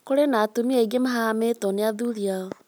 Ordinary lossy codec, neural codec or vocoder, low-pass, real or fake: none; none; none; real